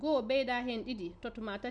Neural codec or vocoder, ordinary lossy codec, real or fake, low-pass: none; none; real; 9.9 kHz